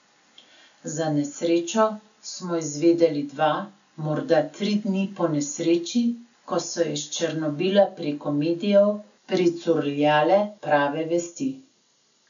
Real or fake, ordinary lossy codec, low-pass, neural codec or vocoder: real; none; 7.2 kHz; none